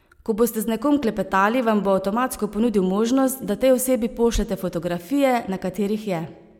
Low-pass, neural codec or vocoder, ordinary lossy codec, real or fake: 19.8 kHz; none; MP3, 96 kbps; real